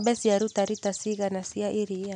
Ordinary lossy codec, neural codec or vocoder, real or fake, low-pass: none; none; real; 9.9 kHz